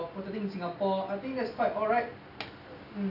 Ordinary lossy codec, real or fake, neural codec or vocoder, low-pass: none; real; none; 5.4 kHz